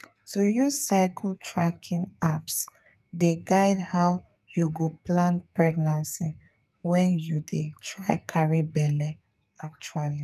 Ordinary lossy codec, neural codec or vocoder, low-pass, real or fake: none; codec, 44.1 kHz, 2.6 kbps, SNAC; 14.4 kHz; fake